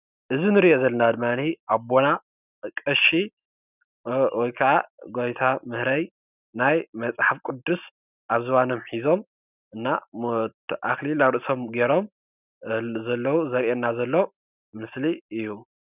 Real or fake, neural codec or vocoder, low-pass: real; none; 3.6 kHz